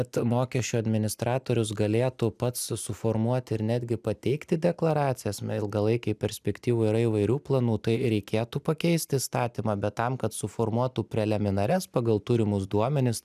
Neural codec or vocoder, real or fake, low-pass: none; real; 14.4 kHz